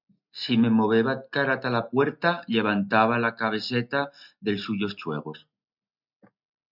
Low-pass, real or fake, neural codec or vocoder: 5.4 kHz; real; none